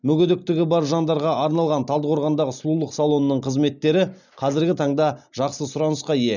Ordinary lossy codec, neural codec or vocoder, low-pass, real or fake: none; none; 7.2 kHz; real